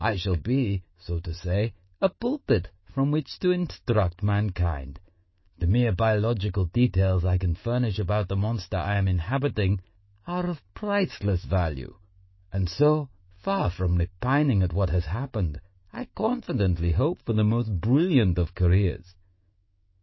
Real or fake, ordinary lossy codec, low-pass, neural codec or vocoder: fake; MP3, 24 kbps; 7.2 kHz; autoencoder, 48 kHz, 128 numbers a frame, DAC-VAE, trained on Japanese speech